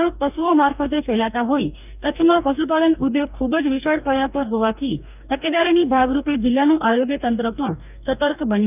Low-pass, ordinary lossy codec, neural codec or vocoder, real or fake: 3.6 kHz; none; codec, 44.1 kHz, 2.6 kbps, DAC; fake